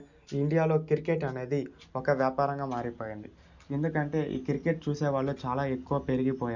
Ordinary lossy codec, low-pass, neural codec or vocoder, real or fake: none; 7.2 kHz; none; real